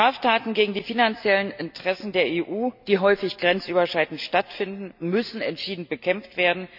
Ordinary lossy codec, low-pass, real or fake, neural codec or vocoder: none; 5.4 kHz; real; none